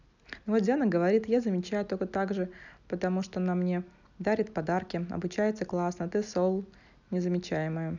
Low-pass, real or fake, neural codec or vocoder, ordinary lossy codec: 7.2 kHz; real; none; none